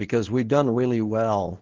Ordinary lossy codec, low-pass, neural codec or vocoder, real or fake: Opus, 16 kbps; 7.2 kHz; codec, 24 kHz, 0.9 kbps, WavTokenizer, medium speech release version 2; fake